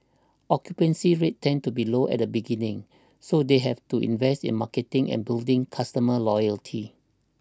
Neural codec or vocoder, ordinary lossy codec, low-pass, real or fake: none; none; none; real